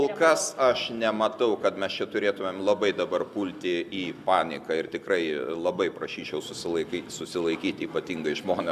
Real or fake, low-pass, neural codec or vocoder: real; 14.4 kHz; none